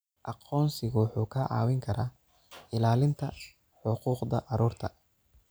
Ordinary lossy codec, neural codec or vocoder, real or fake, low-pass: none; none; real; none